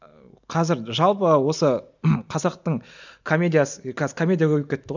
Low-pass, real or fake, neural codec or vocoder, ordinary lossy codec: 7.2 kHz; real; none; none